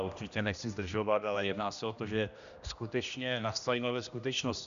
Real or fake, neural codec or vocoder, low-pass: fake; codec, 16 kHz, 1 kbps, X-Codec, HuBERT features, trained on general audio; 7.2 kHz